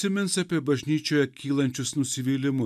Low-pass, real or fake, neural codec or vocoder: 14.4 kHz; real; none